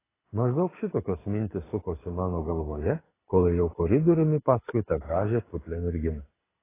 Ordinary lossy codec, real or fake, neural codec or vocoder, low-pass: AAC, 16 kbps; fake; codec, 24 kHz, 6 kbps, HILCodec; 3.6 kHz